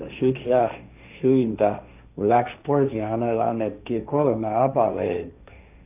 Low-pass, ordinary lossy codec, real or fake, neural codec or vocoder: 3.6 kHz; none; fake; codec, 16 kHz, 1.1 kbps, Voila-Tokenizer